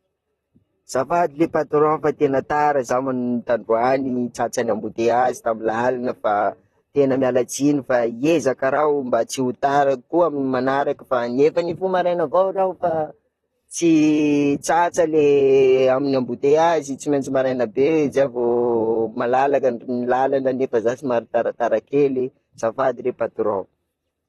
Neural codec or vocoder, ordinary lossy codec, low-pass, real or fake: vocoder, 44.1 kHz, 128 mel bands, Pupu-Vocoder; AAC, 32 kbps; 19.8 kHz; fake